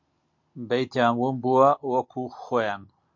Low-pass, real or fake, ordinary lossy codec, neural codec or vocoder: 7.2 kHz; real; MP3, 48 kbps; none